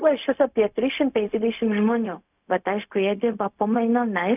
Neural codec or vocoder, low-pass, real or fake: codec, 16 kHz, 0.4 kbps, LongCat-Audio-Codec; 3.6 kHz; fake